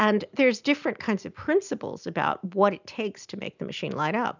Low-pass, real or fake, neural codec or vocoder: 7.2 kHz; real; none